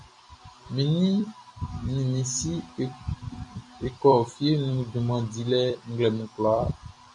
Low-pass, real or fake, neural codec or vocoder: 10.8 kHz; fake; vocoder, 44.1 kHz, 128 mel bands every 256 samples, BigVGAN v2